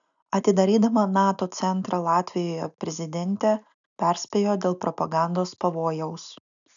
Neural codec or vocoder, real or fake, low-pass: none; real; 7.2 kHz